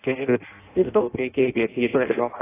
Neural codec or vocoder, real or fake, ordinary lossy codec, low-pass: codec, 16 kHz in and 24 kHz out, 0.6 kbps, FireRedTTS-2 codec; fake; AAC, 16 kbps; 3.6 kHz